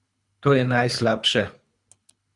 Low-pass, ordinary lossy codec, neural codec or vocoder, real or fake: 10.8 kHz; Opus, 64 kbps; codec, 24 kHz, 3 kbps, HILCodec; fake